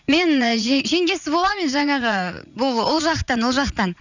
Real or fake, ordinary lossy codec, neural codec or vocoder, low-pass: fake; none; vocoder, 44.1 kHz, 80 mel bands, Vocos; 7.2 kHz